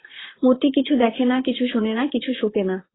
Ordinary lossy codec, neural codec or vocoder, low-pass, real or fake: AAC, 16 kbps; vocoder, 44.1 kHz, 128 mel bands, Pupu-Vocoder; 7.2 kHz; fake